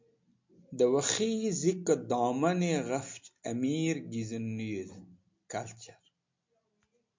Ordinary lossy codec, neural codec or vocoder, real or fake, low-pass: MP3, 48 kbps; none; real; 7.2 kHz